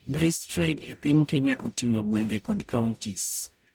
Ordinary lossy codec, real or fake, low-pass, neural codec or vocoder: none; fake; none; codec, 44.1 kHz, 0.9 kbps, DAC